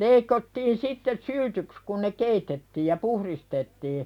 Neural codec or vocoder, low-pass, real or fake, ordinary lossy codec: none; 19.8 kHz; real; none